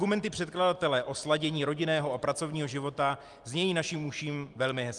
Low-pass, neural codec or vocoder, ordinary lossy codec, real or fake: 10.8 kHz; none; Opus, 32 kbps; real